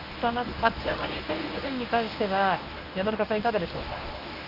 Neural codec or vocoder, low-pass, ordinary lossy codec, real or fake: codec, 24 kHz, 0.9 kbps, WavTokenizer, medium speech release version 1; 5.4 kHz; none; fake